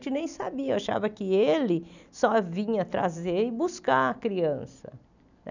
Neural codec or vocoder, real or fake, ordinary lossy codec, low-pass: none; real; none; 7.2 kHz